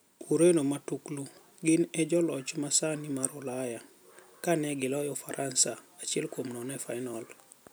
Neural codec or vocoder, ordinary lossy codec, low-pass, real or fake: none; none; none; real